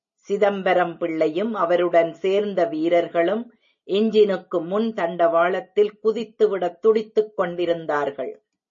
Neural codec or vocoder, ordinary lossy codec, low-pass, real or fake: none; MP3, 32 kbps; 7.2 kHz; real